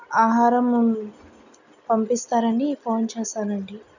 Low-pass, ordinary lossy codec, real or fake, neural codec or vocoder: 7.2 kHz; none; real; none